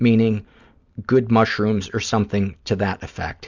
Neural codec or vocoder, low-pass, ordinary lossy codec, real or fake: none; 7.2 kHz; Opus, 64 kbps; real